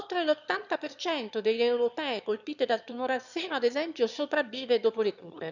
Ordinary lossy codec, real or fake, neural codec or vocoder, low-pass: none; fake; autoencoder, 22.05 kHz, a latent of 192 numbers a frame, VITS, trained on one speaker; 7.2 kHz